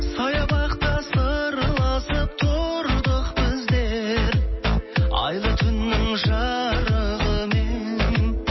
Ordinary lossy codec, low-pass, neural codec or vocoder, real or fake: MP3, 24 kbps; 7.2 kHz; none; real